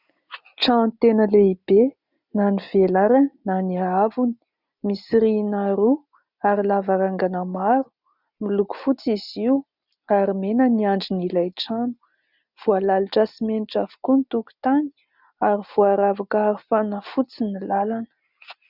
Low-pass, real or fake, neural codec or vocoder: 5.4 kHz; real; none